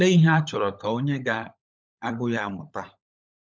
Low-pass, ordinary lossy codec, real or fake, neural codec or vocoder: none; none; fake; codec, 16 kHz, 16 kbps, FunCodec, trained on LibriTTS, 50 frames a second